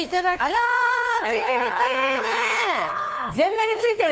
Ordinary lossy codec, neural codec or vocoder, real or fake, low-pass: none; codec, 16 kHz, 2 kbps, FunCodec, trained on LibriTTS, 25 frames a second; fake; none